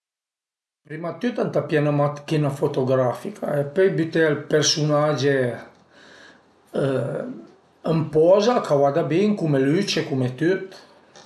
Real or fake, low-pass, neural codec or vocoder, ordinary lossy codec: real; none; none; none